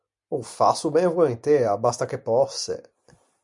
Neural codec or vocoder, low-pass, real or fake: none; 10.8 kHz; real